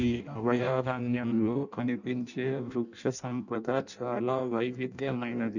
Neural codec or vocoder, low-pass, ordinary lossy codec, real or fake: codec, 16 kHz in and 24 kHz out, 0.6 kbps, FireRedTTS-2 codec; 7.2 kHz; none; fake